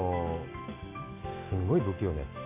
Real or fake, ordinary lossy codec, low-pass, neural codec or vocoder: real; AAC, 24 kbps; 3.6 kHz; none